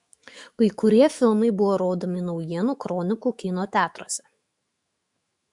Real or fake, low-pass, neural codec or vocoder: fake; 10.8 kHz; autoencoder, 48 kHz, 128 numbers a frame, DAC-VAE, trained on Japanese speech